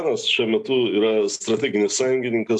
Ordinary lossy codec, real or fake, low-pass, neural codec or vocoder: MP3, 96 kbps; real; 10.8 kHz; none